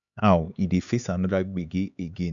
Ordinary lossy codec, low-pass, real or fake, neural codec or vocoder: none; 7.2 kHz; fake; codec, 16 kHz, 4 kbps, X-Codec, HuBERT features, trained on LibriSpeech